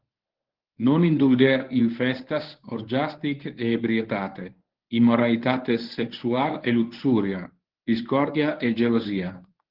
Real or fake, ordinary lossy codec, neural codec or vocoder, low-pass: fake; Opus, 16 kbps; codec, 24 kHz, 0.9 kbps, WavTokenizer, medium speech release version 1; 5.4 kHz